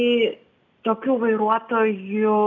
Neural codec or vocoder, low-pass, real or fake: none; 7.2 kHz; real